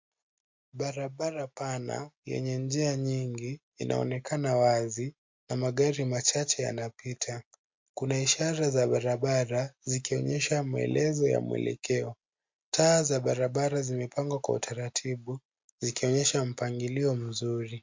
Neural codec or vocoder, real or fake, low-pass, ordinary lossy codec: none; real; 7.2 kHz; MP3, 48 kbps